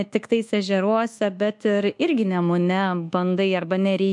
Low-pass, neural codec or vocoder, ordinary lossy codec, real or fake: 10.8 kHz; codec, 24 kHz, 1.2 kbps, DualCodec; MP3, 64 kbps; fake